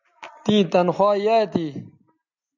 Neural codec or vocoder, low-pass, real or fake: none; 7.2 kHz; real